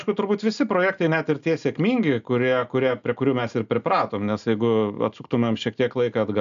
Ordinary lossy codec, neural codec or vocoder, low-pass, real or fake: MP3, 96 kbps; none; 7.2 kHz; real